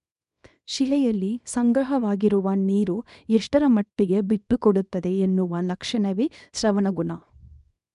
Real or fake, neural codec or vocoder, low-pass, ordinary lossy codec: fake; codec, 24 kHz, 0.9 kbps, WavTokenizer, small release; 10.8 kHz; MP3, 96 kbps